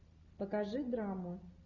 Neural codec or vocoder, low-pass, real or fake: none; 7.2 kHz; real